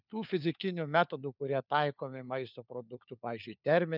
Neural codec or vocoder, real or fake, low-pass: codec, 16 kHz, 4 kbps, FunCodec, trained on LibriTTS, 50 frames a second; fake; 5.4 kHz